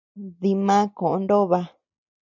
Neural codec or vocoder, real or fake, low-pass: none; real; 7.2 kHz